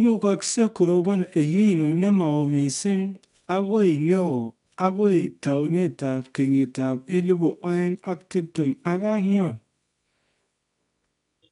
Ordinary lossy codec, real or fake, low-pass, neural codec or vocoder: none; fake; 10.8 kHz; codec, 24 kHz, 0.9 kbps, WavTokenizer, medium music audio release